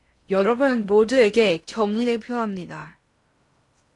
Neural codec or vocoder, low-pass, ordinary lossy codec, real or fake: codec, 16 kHz in and 24 kHz out, 0.6 kbps, FocalCodec, streaming, 2048 codes; 10.8 kHz; AAC, 48 kbps; fake